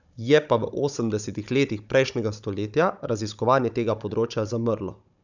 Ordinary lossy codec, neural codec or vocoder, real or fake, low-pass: none; codec, 16 kHz, 16 kbps, FunCodec, trained on Chinese and English, 50 frames a second; fake; 7.2 kHz